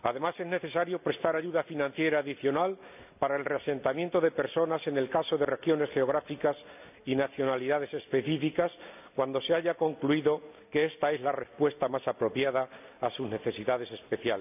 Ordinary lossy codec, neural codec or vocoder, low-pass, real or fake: none; none; 3.6 kHz; real